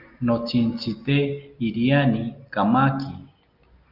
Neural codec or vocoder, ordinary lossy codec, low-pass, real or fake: none; Opus, 24 kbps; 5.4 kHz; real